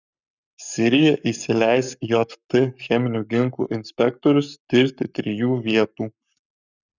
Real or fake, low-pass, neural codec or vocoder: fake; 7.2 kHz; codec, 44.1 kHz, 7.8 kbps, Pupu-Codec